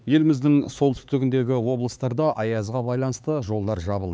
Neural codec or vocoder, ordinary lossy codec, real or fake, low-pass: codec, 16 kHz, 4 kbps, X-Codec, HuBERT features, trained on LibriSpeech; none; fake; none